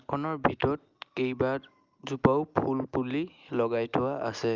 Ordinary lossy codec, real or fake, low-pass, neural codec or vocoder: Opus, 24 kbps; real; 7.2 kHz; none